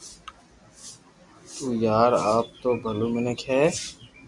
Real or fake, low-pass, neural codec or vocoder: real; 10.8 kHz; none